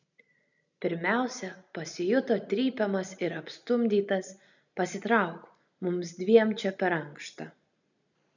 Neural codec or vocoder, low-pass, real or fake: none; 7.2 kHz; real